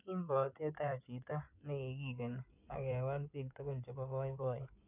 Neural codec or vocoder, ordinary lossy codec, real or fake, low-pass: codec, 16 kHz in and 24 kHz out, 2.2 kbps, FireRedTTS-2 codec; none; fake; 3.6 kHz